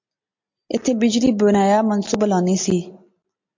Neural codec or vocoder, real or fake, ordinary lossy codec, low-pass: none; real; MP3, 48 kbps; 7.2 kHz